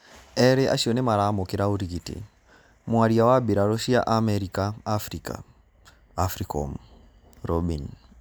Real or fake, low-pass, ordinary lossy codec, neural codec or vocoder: real; none; none; none